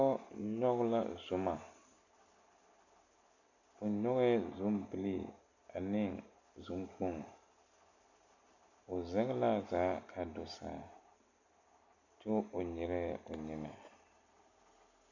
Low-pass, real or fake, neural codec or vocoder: 7.2 kHz; real; none